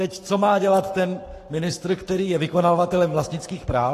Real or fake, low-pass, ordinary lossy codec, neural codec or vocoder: fake; 14.4 kHz; AAC, 48 kbps; codec, 44.1 kHz, 7.8 kbps, Pupu-Codec